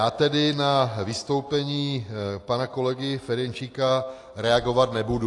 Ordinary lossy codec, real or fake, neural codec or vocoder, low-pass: AAC, 48 kbps; real; none; 10.8 kHz